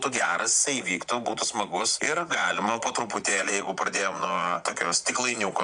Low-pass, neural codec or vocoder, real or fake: 9.9 kHz; vocoder, 22.05 kHz, 80 mel bands, Vocos; fake